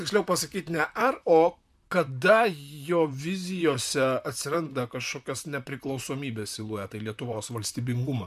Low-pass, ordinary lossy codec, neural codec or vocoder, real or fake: 14.4 kHz; MP3, 96 kbps; vocoder, 44.1 kHz, 128 mel bands, Pupu-Vocoder; fake